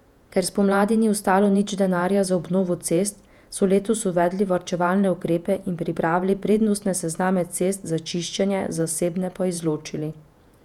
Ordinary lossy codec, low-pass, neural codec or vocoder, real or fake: none; 19.8 kHz; vocoder, 48 kHz, 128 mel bands, Vocos; fake